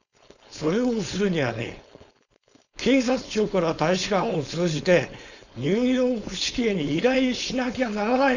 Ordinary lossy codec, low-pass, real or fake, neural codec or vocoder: none; 7.2 kHz; fake; codec, 16 kHz, 4.8 kbps, FACodec